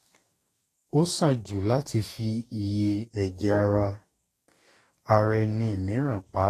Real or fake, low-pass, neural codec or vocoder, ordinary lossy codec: fake; 14.4 kHz; codec, 44.1 kHz, 2.6 kbps, DAC; AAC, 48 kbps